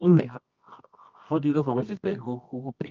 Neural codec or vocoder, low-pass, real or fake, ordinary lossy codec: codec, 24 kHz, 0.9 kbps, WavTokenizer, medium music audio release; 7.2 kHz; fake; Opus, 32 kbps